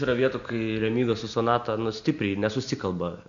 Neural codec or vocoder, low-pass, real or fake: none; 7.2 kHz; real